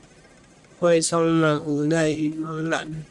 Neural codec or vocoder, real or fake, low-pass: codec, 44.1 kHz, 1.7 kbps, Pupu-Codec; fake; 10.8 kHz